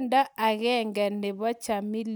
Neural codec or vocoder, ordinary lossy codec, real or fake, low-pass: none; none; real; none